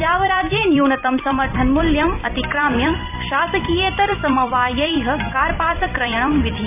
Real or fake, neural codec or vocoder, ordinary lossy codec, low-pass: fake; vocoder, 44.1 kHz, 128 mel bands every 256 samples, BigVGAN v2; none; 3.6 kHz